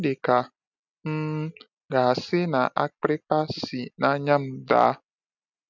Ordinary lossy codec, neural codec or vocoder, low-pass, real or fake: none; none; 7.2 kHz; real